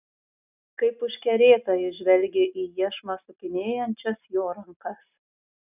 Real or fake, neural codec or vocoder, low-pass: real; none; 3.6 kHz